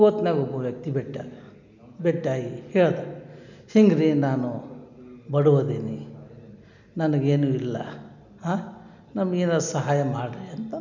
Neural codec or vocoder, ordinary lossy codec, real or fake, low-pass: none; none; real; 7.2 kHz